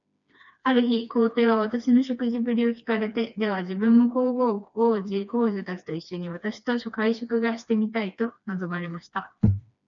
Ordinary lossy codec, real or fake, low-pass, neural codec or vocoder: MP3, 96 kbps; fake; 7.2 kHz; codec, 16 kHz, 2 kbps, FreqCodec, smaller model